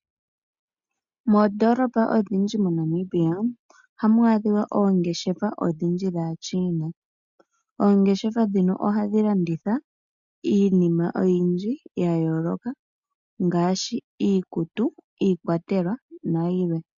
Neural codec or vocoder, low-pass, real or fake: none; 7.2 kHz; real